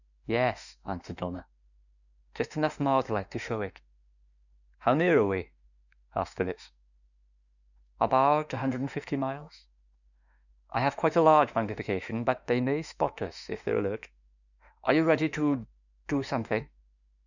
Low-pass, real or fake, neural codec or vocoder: 7.2 kHz; fake; autoencoder, 48 kHz, 32 numbers a frame, DAC-VAE, trained on Japanese speech